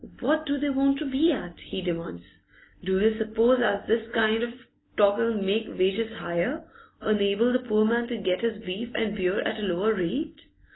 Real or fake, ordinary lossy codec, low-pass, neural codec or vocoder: real; AAC, 16 kbps; 7.2 kHz; none